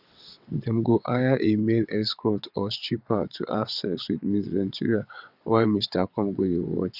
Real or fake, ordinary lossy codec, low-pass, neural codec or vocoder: fake; none; 5.4 kHz; vocoder, 22.05 kHz, 80 mel bands, WaveNeXt